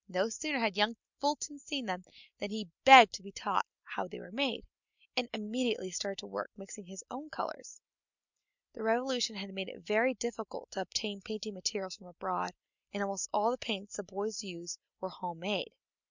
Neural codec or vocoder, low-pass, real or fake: none; 7.2 kHz; real